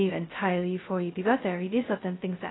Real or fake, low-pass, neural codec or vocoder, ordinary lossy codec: fake; 7.2 kHz; codec, 16 kHz, 0.2 kbps, FocalCodec; AAC, 16 kbps